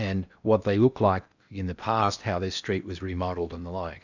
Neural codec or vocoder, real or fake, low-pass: codec, 16 kHz in and 24 kHz out, 0.8 kbps, FocalCodec, streaming, 65536 codes; fake; 7.2 kHz